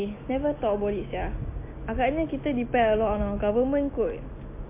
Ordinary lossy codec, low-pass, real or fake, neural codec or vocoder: MP3, 24 kbps; 3.6 kHz; real; none